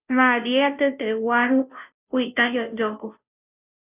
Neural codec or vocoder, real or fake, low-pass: codec, 16 kHz, 0.5 kbps, FunCodec, trained on Chinese and English, 25 frames a second; fake; 3.6 kHz